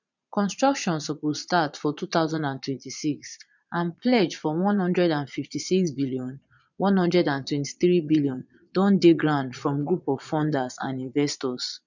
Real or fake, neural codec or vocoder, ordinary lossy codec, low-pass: fake; vocoder, 22.05 kHz, 80 mel bands, Vocos; none; 7.2 kHz